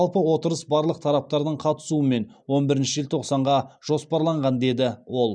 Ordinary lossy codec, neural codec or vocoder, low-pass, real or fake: none; none; 9.9 kHz; real